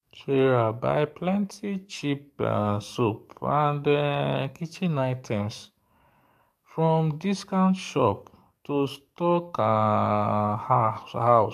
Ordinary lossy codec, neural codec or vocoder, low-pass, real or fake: AAC, 96 kbps; codec, 44.1 kHz, 7.8 kbps, DAC; 14.4 kHz; fake